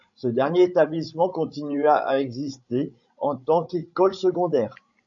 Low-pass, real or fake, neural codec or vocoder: 7.2 kHz; fake; codec, 16 kHz, 16 kbps, FreqCodec, larger model